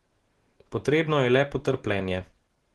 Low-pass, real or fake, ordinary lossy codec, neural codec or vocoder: 10.8 kHz; real; Opus, 16 kbps; none